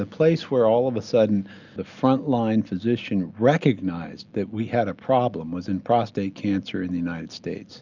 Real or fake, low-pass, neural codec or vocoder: real; 7.2 kHz; none